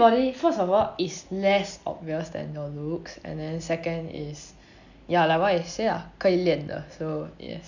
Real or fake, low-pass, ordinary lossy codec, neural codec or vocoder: real; 7.2 kHz; none; none